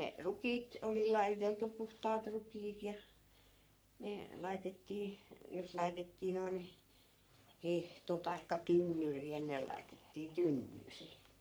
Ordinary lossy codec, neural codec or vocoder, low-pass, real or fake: none; codec, 44.1 kHz, 3.4 kbps, Pupu-Codec; none; fake